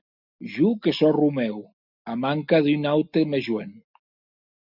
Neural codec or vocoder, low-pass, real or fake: none; 5.4 kHz; real